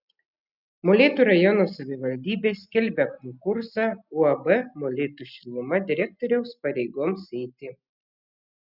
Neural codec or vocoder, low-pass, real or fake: none; 5.4 kHz; real